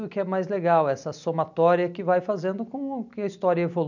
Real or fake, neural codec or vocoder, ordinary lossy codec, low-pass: real; none; none; 7.2 kHz